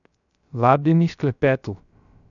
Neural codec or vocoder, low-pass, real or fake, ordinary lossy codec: codec, 16 kHz, 0.3 kbps, FocalCodec; 7.2 kHz; fake; none